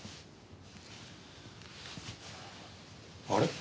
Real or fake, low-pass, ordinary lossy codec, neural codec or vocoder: real; none; none; none